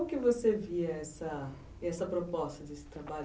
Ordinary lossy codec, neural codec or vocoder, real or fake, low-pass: none; none; real; none